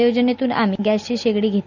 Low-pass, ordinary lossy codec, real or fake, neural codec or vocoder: 7.2 kHz; none; real; none